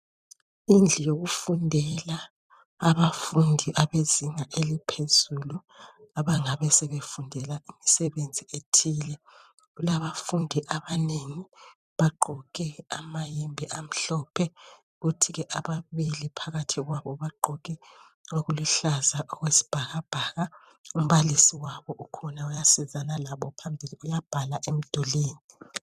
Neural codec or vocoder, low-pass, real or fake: vocoder, 44.1 kHz, 128 mel bands every 256 samples, BigVGAN v2; 14.4 kHz; fake